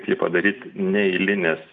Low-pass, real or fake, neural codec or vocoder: 7.2 kHz; real; none